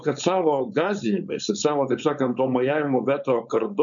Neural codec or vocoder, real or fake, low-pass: codec, 16 kHz, 4.8 kbps, FACodec; fake; 7.2 kHz